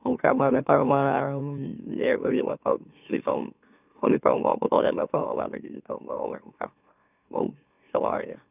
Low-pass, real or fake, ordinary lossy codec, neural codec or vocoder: 3.6 kHz; fake; none; autoencoder, 44.1 kHz, a latent of 192 numbers a frame, MeloTTS